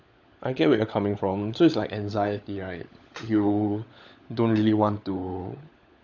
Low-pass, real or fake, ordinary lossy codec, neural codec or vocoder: 7.2 kHz; fake; none; codec, 16 kHz, 16 kbps, FunCodec, trained on LibriTTS, 50 frames a second